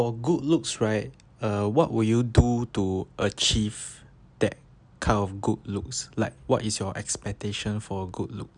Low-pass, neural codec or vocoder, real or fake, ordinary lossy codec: 9.9 kHz; none; real; none